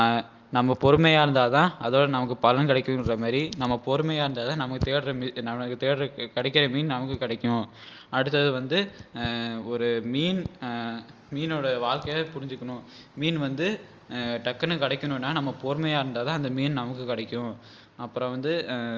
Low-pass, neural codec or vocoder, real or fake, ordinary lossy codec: 7.2 kHz; none; real; Opus, 32 kbps